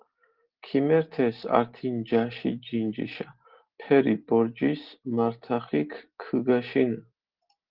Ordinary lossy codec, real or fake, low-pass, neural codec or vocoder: Opus, 16 kbps; real; 5.4 kHz; none